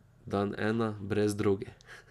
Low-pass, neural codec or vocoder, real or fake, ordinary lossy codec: 14.4 kHz; none; real; none